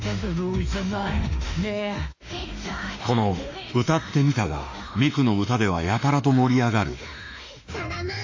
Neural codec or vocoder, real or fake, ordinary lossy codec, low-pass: autoencoder, 48 kHz, 32 numbers a frame, DAC-VAE, trained on Japanese speech; fake; AAC, 48 kbps; 7.2 kHz